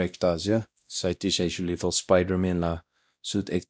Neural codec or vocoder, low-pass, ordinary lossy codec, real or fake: codec, 16 kHz, 1 kbps, X-Codec, WavLM features, trained on Multilingual LibriSpeech; none; none; fake